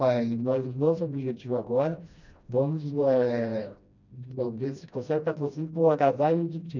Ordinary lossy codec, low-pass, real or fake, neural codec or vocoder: none; 7.2 kHz; fake; codec, 16 kHz, 1 kbps, FreqCodec, smaller model